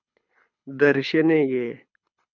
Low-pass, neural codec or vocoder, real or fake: 7.2 kHz; codec, 24 kHz, 6 kbps, HILCodec; fake